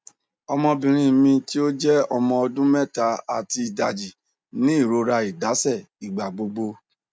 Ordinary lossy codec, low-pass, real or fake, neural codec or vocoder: none; none; real; none